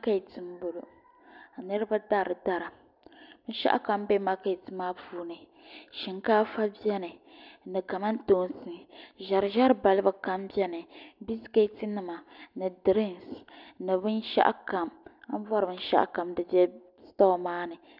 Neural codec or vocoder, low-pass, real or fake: none; 5.4 kHz; real